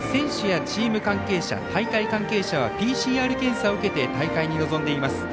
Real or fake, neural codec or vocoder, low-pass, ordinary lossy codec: real; none; none; none